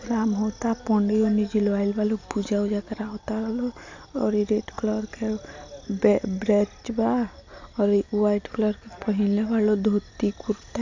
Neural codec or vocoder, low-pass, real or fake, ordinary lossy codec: none; 7.2 kHz; real; AAC, 48 kbps